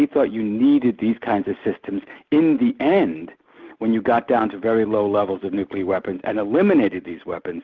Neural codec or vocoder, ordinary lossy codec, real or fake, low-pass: none; Opus, 32 kbps; real; 7.2 kHz